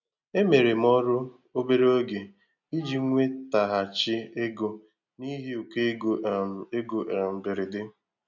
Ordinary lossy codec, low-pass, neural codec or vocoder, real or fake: none; 7.2 kHz; none; real